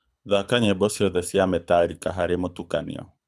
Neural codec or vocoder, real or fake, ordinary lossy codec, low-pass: codec, 24 kHz, 6 kbps, HILCodec; fake; none; none